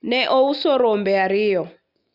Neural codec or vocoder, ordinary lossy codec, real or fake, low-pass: none; Opus, 64 kbps; real; 5.4 kHz